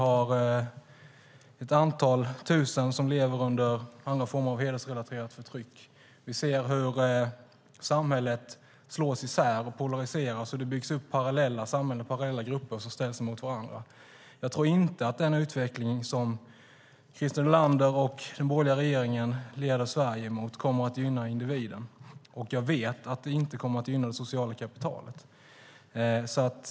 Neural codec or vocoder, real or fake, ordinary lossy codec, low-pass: none; real; none; none